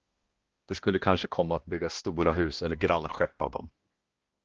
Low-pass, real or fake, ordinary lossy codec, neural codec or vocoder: 7.2 kHz; fake; Opus, 16 kbps; codec, 16 kHz, 1 kbps, X-Codec, HuBERT features, trained on balanced general audio